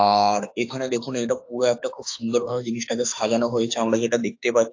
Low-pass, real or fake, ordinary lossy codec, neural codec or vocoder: 7.2 kHz; fake; AAC, 48 kbps; autoencoder, 48 kHz, 32 numbers a frame, DAC-VAE, trained on Japanese speech